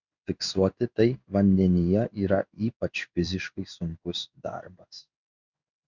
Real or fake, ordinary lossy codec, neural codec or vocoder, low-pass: fake; Opus, 64 kbps; codec, 16 kHz in and 24 kHz out, 1 kbps, XY-Tokenizer; 7.2 kHz